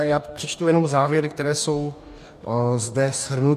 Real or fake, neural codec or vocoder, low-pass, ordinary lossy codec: fake; codec, 44.1 kHz, 2.6 kbps, DAC; 14.4 kHz; MP3, 96 kbps